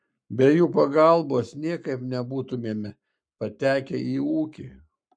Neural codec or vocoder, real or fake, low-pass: codec, 44.1 kHz, 7.8 kbps, Pupu-Codec; fake; 9.9 kHz